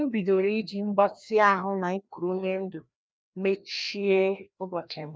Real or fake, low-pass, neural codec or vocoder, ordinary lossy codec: fake; none; codec, 16 kHz, 1 kbps, FreqCodec, larger model; none